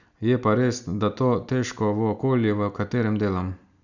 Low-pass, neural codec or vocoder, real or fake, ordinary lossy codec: 7.2 kHz; none; real; none